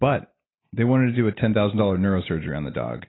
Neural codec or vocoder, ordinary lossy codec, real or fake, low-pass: none; AAC, 16 kbps; real; 7.2 kHz